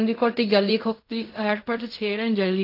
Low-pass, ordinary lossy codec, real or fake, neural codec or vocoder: 5.4 kHz; AAC, 32 kbps; fake; codec, 16 kHz in and 24 kHz out, 0.4 kbps, LongCat-Audio-Codec, fine tuned four codebook decoder